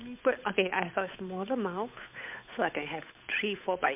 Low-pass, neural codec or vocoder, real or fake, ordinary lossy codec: 3.6 kHz; codec, 16 kHz, 8 kbps, FunCodec, trained on Chinese and English, 25 frames a second; fake; MP3, 32 kbps